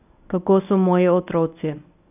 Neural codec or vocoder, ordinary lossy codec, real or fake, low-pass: codec, 24 kHz, 0.9 kbps, WavTokenizer, medium speech release version 1; none; fake; 3.6 kHz